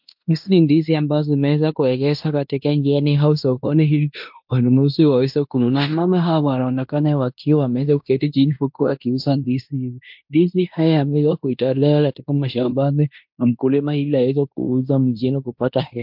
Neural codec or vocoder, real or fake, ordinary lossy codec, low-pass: codec, 16 kHz in and 24 kHz out, 0.9 kbps, LongCat-Audio-Codec, fine tuned four codebook decoder; fake; MP3, 48 kbps; 5.4 kHz